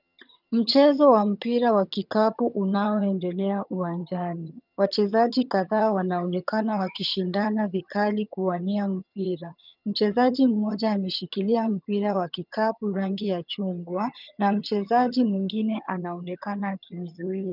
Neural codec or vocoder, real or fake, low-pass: vocoder, 22.05 kHz, 80 mel bands, HiFi-GAN; fake; 5.4 kHz